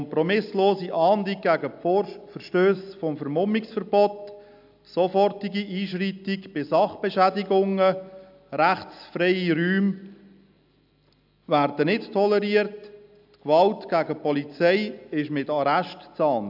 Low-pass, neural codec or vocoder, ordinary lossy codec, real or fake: 5.4 kHz; none; AAC, 48 kbps; real